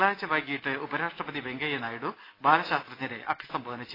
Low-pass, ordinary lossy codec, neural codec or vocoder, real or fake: 5.4 kHz; AAC, 24 kbps; none; real